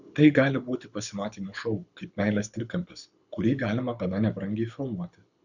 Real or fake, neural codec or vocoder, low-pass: fake; codec, 24 kHz, 6 kbps, HILCodec; 7.2 kHz